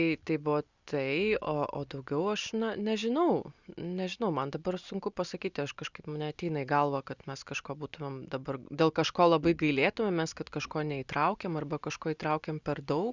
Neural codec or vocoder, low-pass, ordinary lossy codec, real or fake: none; 7.2 kHz; Opus, 64 kbps; real